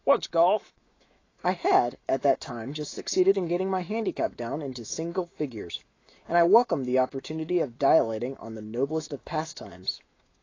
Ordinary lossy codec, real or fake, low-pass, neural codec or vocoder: AAC, 32 kbps; fake; 7.2 kHz; autoencoder, 48 kHz, 128 numbers a frame, DAC-VAE, trained on Japanese speech